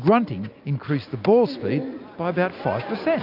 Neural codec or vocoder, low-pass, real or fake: none; 5.4 kHz; real